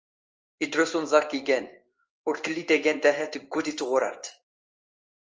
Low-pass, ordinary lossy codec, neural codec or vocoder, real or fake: 7.2 kHz; Opus, 24 kbps; codec, 16 kHz in and 24 kHz out, 1 kbps, XY-Tokenizer; fake